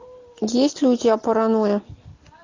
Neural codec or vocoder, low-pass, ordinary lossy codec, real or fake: none; 7.2 kHz; AAC, 32 kbps; real